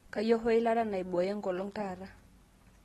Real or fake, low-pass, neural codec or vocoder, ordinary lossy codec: real; 19.8 kHz; none; AAC, 32 kbps